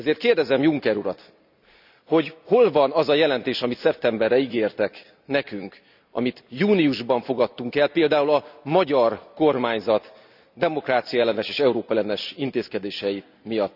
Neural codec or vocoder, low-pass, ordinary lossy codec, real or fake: none; 5.4 kHz; none; real